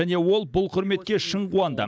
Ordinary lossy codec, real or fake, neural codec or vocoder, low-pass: none; real; none; none